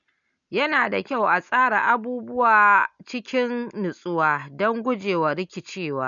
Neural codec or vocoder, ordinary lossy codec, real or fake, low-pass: none; none; real; 7.2 kHz